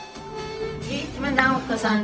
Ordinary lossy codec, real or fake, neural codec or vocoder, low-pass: none; fake; codec, 16 kHz, 0.4 kbps, LongCat-Audio-Codec; none